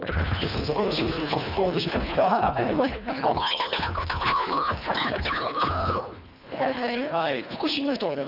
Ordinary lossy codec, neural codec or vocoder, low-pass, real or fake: none; codec, 24 kHz, 1.5 kbps, HILCodec; 5.4 kHz; fake